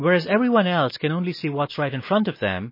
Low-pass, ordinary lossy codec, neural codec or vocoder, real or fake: 5.4 kHz; MP3, 24 kbps; none; real